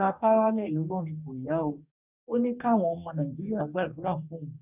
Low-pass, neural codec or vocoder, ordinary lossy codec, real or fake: 3.6 kHz; codec, 44.1 kHz, 2.6 kbps, DAC; none; fake